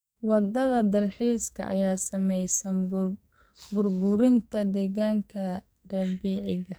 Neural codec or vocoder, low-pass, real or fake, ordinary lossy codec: codec, 44.1 kHz, 2.6 kbps, SNAC; none; fake; none